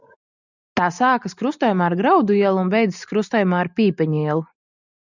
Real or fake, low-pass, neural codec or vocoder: real; 7.2 kHz; none